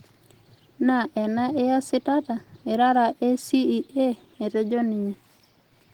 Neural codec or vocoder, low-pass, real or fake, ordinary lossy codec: none; 19.8 kHz; real; Opus, 16 kbps